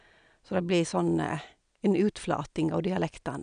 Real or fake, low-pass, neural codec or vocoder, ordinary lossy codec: real; 9.9 kHz; none; none